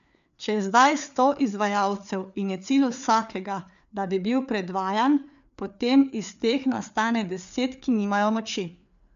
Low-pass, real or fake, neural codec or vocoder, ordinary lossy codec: 7.2 kHz; fake; codec, 16 kHz, 4 kbps, FreqCodec, larger model; none